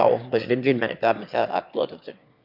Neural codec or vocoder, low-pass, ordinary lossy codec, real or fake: autoencoder, 22.05 kHz, a latent of 192 numbers a frame, VITS, trained on one speaker; 5.4 kHz; none; fake